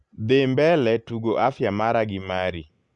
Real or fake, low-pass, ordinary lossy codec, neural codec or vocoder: real; 10.8 kHz; Opus, 64 kbps; none